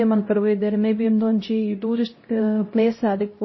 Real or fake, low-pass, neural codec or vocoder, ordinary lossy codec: fake; 7.2 kHz; codec, 16 kHz, 0.5 kbps, X-Codec, HuBERT features, trained on LibriSpeech; MP3, 24 kbps